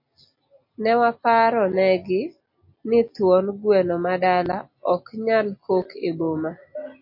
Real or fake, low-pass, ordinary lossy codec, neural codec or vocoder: real; 5.4 kHz; MP3, 32 kbps; none